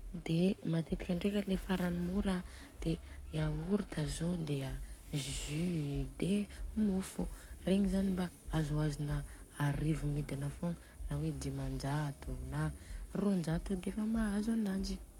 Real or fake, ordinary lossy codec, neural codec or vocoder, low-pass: fake; MP3, 96 kbps; codec, 44.1 kHz, 7.8 kbps, Pupu-Codec; 19.8 kHz